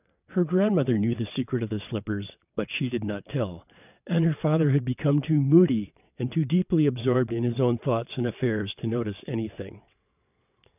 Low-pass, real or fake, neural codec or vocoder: 3.6 kHz; fake; vocoder, 22.05 kHz, 80 mel bands, WaveNeXt